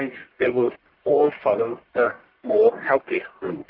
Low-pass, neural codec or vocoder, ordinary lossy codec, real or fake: 5.4 kHz; codec, 44.1 kHz, 1.7 kbps, Pupu-Codec; Opus, 24 kbps; fake